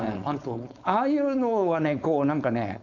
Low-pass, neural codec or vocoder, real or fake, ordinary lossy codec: 7.2 kHz; codec, 16 kHz, 4.8 kbps, FACodec; fake; none